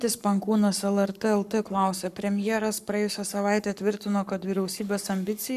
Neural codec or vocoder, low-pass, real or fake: codec, 44.1 kHz, 7.8 kbps, Pupu-Codec; 14.4 kHz; fake